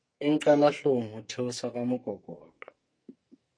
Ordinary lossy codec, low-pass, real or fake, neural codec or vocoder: MP3, 48 kbps; 9.9 kHz; fake; codec, 44.1 kHz, 2.6 kbps, SNAC